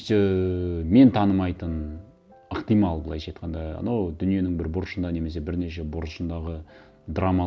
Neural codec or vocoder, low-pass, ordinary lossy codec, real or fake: none; none; none; real